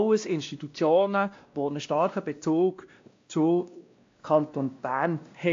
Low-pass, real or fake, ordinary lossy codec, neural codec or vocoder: 7.2 kHz; fake; AAC, 48 kbps; codec, 16 kHz, 1 kbps, X-Codec, WavLM features, trained on Multilingual LibriSpeech